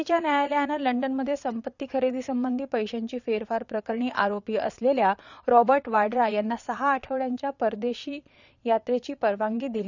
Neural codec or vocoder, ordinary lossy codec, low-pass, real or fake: vocoder, 22.05 kHz, 80 mel bands, Vocos; none; 7.2 kHz; fake